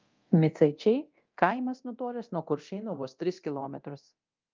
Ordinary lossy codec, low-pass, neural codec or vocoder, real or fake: Opus, 24 kbps; 7.2 kHz; codec, 24 kHz, 0.9 kbps, DualCodec; fake